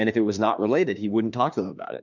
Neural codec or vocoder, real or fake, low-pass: autoencoder, 48 kHz, 32 numbers a frame, DAC-VAE, trained on Japanese speech; fake; 7.2 kHz